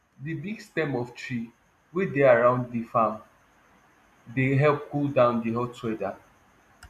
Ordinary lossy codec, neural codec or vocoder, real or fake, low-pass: none; none; real; 14.4 kHz